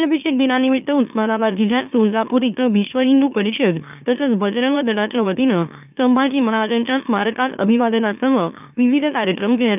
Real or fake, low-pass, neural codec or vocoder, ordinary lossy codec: fake; 3.6 kHz; autoencoder, 44.1 kHz, a latent of 192 numbers a frame, MeloTTS; none